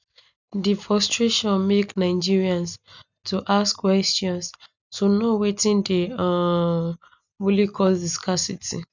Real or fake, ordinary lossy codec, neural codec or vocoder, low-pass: real; none; none; 7.2 kHz